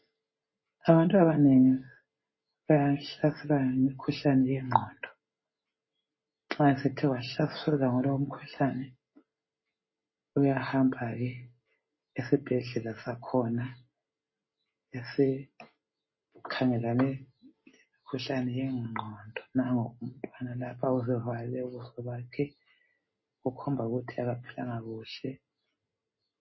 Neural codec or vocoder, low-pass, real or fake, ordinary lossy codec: none; 7.2 kHz; real; MP3, 24 kbps